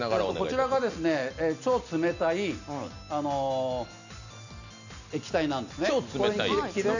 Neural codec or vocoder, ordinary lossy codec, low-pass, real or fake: none; none; 7.2 kHz; real